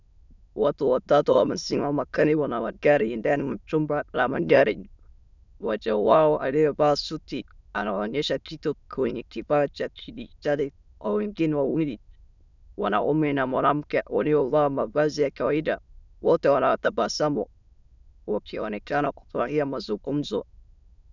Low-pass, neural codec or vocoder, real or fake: 7.2 kHz; autoencoder, 22.05 kHz, a latent of 192 numbers a frame, VITS, trained on many speakers; fake